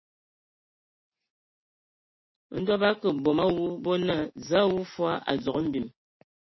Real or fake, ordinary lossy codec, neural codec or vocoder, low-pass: real; MP3, 24 kbps; none; 7.2 kHz